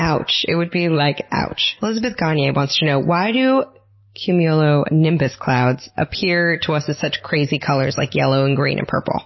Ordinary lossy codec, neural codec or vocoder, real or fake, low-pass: MP3, 24 kbps; none; real; 7.2 kHz